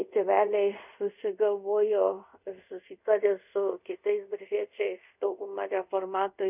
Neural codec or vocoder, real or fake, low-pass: codec, 24 kHz, 0.5 kbps, DualCodec; fake; 3.6 kHz